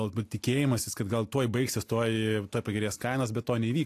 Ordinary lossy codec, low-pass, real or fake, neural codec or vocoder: AAC, 64 kbps; 14.4 kHz; real; none